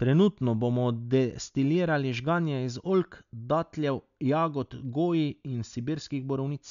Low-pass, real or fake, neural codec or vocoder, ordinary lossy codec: 7.2 kHz; real; none; none